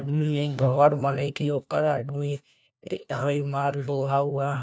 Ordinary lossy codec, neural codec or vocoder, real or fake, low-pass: none; codec, 16 kHz, 1 kbps, FunCodec, trained on LibriTTS, 50 frames a second; fake; none